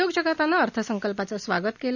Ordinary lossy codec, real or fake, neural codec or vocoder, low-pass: none; real; none; 7.2 kHz